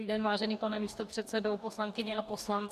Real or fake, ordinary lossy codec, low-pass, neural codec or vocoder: fake; MP3, 96 kbps; 14.4 kHz; codec, 44.1 kHz, 2.6 kbps, DAC